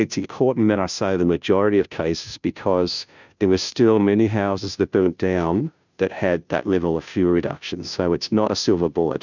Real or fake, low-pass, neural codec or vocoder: fake; 7.2 kHz; codec, 16 kHz, 0.5 kbps, FunCodec, trained on Chinese and English, 25 frames a second